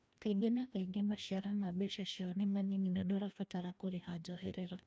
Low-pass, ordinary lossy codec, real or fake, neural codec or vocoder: none; none; fake; codec, 16 kHz, 1 kbps, FreqCodec, larger model